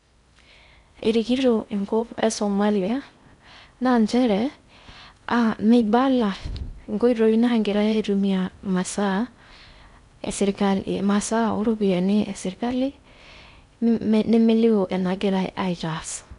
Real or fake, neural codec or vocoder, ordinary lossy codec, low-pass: fake; codec, 16 kHz in and 24 kHz out, 0.6 kbps, FocalCodec, streaming, 4096 codes; none; 10.8 kHz